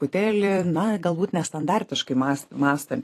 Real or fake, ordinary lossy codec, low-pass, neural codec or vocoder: fake; AAC, 48 kbps; 14.4 kHz; vocoder, 44.1 kHz, 128 mel bands every 256 samples, BigVGAN v2